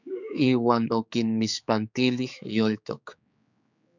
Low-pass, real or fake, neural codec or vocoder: 7.2 kHz; fake; codec, 16 kHz, 4 kbps, X-Codec, HuBERT features, trained on general audio